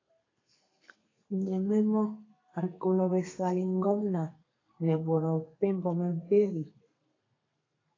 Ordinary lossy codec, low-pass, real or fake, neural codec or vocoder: AAC, 32 kbps; 7.2 kHz; fake; codec, 32 kHz, 1.9 kbps, SNAC